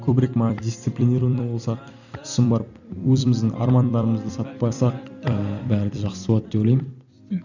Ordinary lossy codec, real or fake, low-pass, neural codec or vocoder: none; fake; 7.2 kHz; vocoder, 44.1 kHz, 128 mel bands every 256 samples, BigVGAN v2